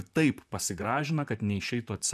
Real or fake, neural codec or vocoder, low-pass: fake; vocoder, 44.1 kHz, 128 mel bands, Pupu-Vocoder; 14.4 kHz